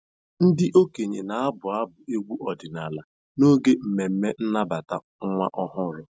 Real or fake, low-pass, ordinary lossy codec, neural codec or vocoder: real; none; none; none